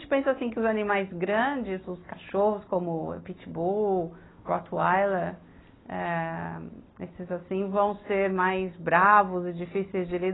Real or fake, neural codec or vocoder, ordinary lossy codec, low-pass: real; none; AAC, 16 kbps; 7.2 kHz